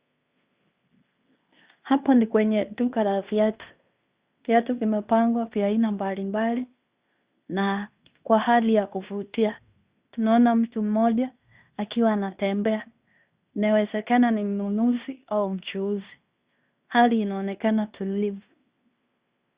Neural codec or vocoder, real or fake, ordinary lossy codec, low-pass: codec, 16 kHz in and 24 kHz out, 0.9 kbps, LongCat-Audio-Codec, fine tuned four codebook decoder; fake; Opus, 64 kbps; 3.6 kHz